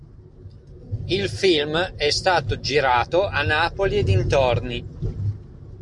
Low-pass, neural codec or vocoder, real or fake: 10.8 kHz; none; real